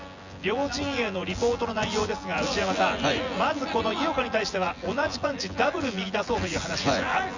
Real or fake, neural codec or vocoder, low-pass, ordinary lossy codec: fake; vocoder, 24 kHz, 100 mel bands, Vocos; 7.2 kHz; Opus, 64 kbps